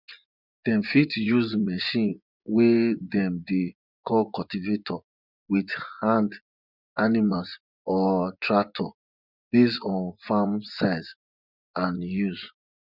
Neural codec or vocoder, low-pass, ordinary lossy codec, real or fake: vocoder, 24 kHz, 100 mel bands, Vocos; 5.4 kHz; none; fake